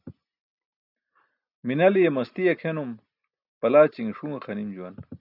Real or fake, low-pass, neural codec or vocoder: real; 5.4 kHz; none